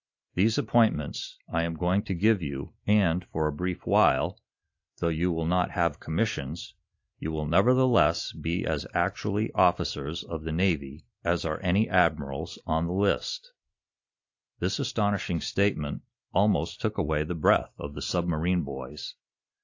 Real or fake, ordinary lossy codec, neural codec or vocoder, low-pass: real; AAC, 48 kbps; none; 7.2 kHz